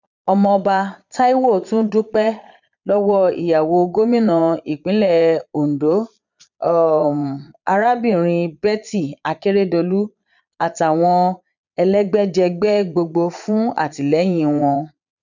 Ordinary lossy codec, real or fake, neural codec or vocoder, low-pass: none; fake; vocoder, 22.05 kHz, 80 mel bands, Vocos; 7.2 kHz